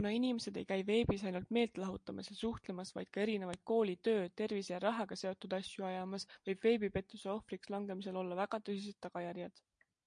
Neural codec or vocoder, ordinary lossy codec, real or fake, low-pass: none; MP3, 64 kbps; real; 9.9 kHz